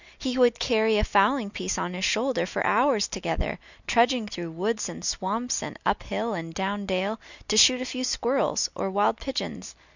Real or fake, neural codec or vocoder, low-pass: real; none; 7.2 kHz